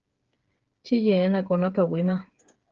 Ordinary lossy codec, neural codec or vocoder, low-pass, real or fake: Opus, 16 kbps; codec, 16 kHz, 8 kbps, FreqCodec, smaller model; 7.2 kHz; fake